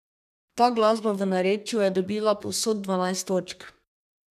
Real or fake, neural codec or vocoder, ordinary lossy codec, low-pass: fake; codec, 32 kHz, 1.9 kbps, SNAC; none; 14.4 kHz